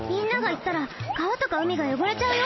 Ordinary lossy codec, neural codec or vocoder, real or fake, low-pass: MP3, 24 kbps; none; real; 7.2 kHz